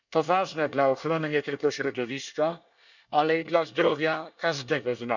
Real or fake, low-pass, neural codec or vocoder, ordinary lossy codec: fake; 7.2 kHz; codec, 24 kHz, 1 kbps, SNAC; none